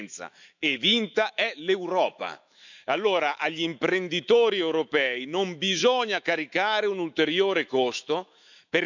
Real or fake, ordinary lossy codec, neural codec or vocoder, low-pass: fake; none; autoencoder, 48 kHz, 128 numbers a frame, DAC-VAE, trained on Japanese speech; 7.2 kHz